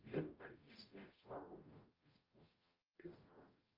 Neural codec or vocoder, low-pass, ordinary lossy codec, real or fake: codec, 44.1 kHz, 0.9 kbps, DAC; 5.4 kHz; Opus, 24 kbps; fake